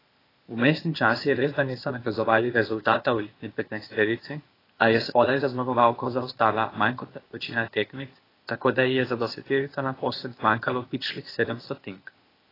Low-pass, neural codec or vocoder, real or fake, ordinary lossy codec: 5.4 kHz; codec, 16 kHz, 0.8 kbps, ZipCodec; fake; AAC, 24 kbps